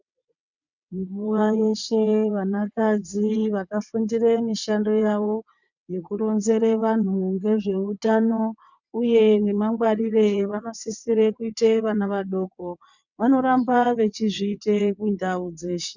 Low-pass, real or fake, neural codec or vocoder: 7.2 kHz; fake; vocoder, 22.05 kHz, 80 mel bands, WaveNeXt